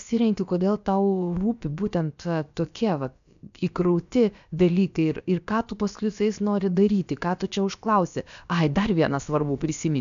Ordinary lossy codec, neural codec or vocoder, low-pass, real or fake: AAC, 96 kbps; codec, 16 kHz, about 1 kbps, DyCAST, with the encoder's durations; 7.2 kHz; fake